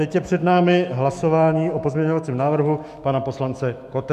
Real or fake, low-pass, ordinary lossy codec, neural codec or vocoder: fake; 14.4 kHz; MP3, 96 kbps; autoencoder, 48 kHz, 128 numbers a frame, DAC-VAE, trained on Japanese speech